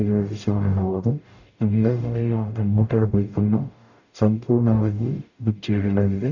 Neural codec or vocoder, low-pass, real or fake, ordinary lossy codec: codec, 44.1 kHz, 0.9 kbps, DAC; 7.2 kHz; fake; none